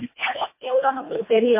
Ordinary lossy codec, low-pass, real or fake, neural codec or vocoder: MP3, 24 kbps; 3.6 kHz; fake; codec, 24 kHz, 1.5 kbps, HILCodec